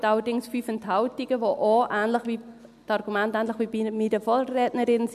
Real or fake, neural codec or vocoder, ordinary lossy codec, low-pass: real; none; none; 14.4 kHz